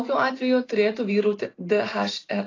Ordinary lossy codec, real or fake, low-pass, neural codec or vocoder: AAC, 32 kbps; real; 7.2 kHz; none